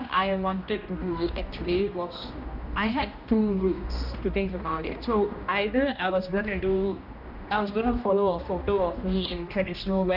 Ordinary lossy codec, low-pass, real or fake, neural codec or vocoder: none; 5.4 kHz; fake; codec, 16 kHz, 1 kbps, X-Codec, HuBERT features, trained on general audio